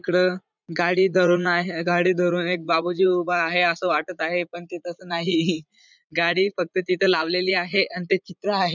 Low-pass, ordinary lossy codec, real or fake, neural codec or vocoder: 7.2 kHz; none; fake; vocoder, 44.1 kHz, 80 mel bands, Vocos